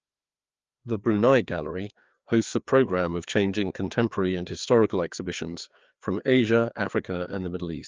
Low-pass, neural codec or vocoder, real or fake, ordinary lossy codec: 7.2 kHz; codec, 16 kHz, 2 kbps, FreqCodec, larger model; fake; Opus, 24 kbps